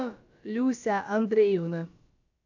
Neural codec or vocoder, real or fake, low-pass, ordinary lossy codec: codec, 16 kHz, about 1 kbps, DyCAST, with the encoder's durations; fake; 7.2 kHz; AAC, 48 kbps